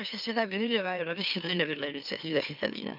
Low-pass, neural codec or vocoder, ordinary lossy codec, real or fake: 5.4 kHz; autoencoder, 44.1 kHz, a latent of 192 numbers a frame, MeloTTS; none; fake